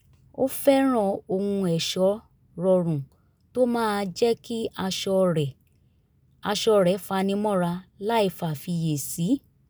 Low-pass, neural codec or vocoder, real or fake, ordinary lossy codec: none; none; real; none